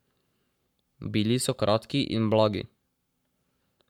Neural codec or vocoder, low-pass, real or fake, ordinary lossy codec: codec, 44.1 kHz, 7.8 kbps, Pupu-Codec; 19.8 kHz; fake; none